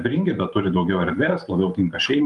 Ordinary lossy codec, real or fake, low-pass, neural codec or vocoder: Opus, 32 kbps; fake; 10.8 kHz; vocoder, 44.1 kHz, 128 mel bands every 512 samples, BigVGAN v2